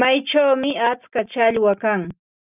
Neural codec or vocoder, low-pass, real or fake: none; 3.6 kHz; real